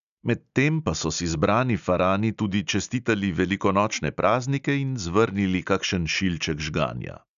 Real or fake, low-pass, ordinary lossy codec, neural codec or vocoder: real; 7.2 kHz; none; none